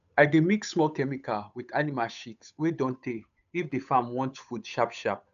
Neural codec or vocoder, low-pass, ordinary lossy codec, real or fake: codec, 16 kHz, 8 kbps, FunCodec, trained on Chinese and English, 25 frames a second; 7.2 kHz; AAC, 96 kbps; fake